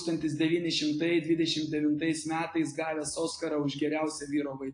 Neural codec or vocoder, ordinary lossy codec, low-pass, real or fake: none; AAC, 48 kbps; 9.9 kHz; real